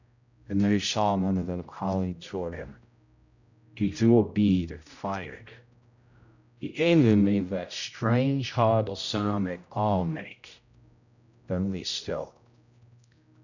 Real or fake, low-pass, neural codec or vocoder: fake; 7.2 kHz; codec, 16 kHz, 0.5 kbps, X-Codec, HuBERT features, trained on general audio